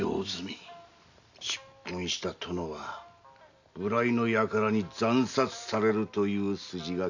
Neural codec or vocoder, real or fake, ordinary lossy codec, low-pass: none; real; none; 7.2 kHz